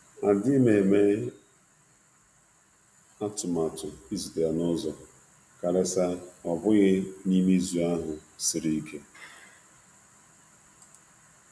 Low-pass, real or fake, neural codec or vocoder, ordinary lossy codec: none; real; none; none